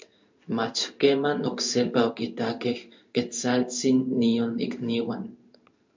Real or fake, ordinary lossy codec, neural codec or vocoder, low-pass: fake; MP3, 64 kbps; codec, 16 kHz in and 24 kHz out, 1 kbps, XY-Tokenizer; 7.2 kHz